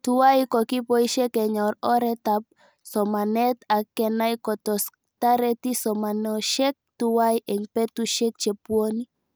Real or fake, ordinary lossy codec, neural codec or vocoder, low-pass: real; none; none; none